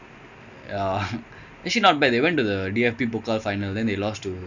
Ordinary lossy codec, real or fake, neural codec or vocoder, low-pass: none; real; none; 7.2 kHz